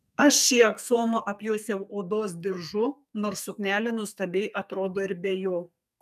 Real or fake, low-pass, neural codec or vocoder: fake; 14.4 kHz; codec, 44.1 kHz, 2.6 kbps, SNAC